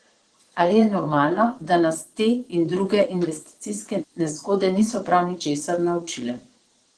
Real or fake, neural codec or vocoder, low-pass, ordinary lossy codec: fake; vocoder, 22.05 kHz, 80 mel bands, Vocos; 9.9 kHz; Opus, 16 kbps